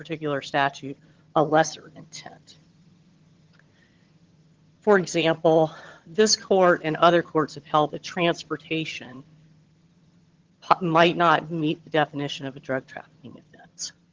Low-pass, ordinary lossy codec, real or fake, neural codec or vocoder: 7.2 kHz; Opus, 16 kbps; fake; vocoder, 22.05 kHz, 80 mel bands, HiFi-GAN